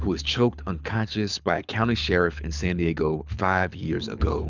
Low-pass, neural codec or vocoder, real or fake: 7.2 kHz; codec, 24 kHz, 6 kbps, HILCodec; fake